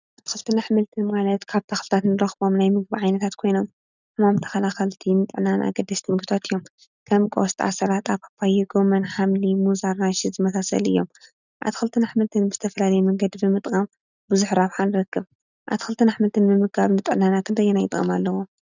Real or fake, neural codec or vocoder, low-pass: real; none; 7.2 kHz